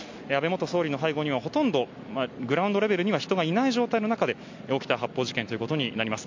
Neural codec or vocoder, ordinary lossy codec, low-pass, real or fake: none; MP3, 48 kbps; 7.2 kHz; real